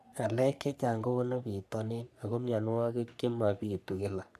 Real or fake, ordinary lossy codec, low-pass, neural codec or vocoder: fake; none; 14.4 kHz; codec, 44.1 kHz, 3.4 kbps, Pupu-Codec